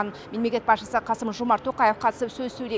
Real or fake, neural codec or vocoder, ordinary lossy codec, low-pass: real; none; none; none